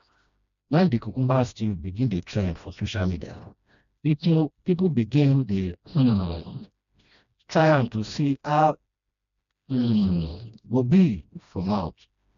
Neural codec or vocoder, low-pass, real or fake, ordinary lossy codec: codec, 16 kHz, 1 kbps, FreqCodec, smaller model; 7.2 kHz; fake; MP3, 96 kbps